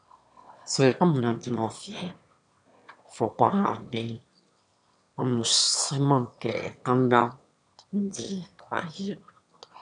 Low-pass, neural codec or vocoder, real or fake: 9.9 kHz; autoencoder, 22.05 kHz, a latent of 192 numbers a frame, VITS, trained on one speaker; fake